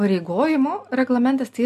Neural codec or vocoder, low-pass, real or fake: none; 14.4 kHz; real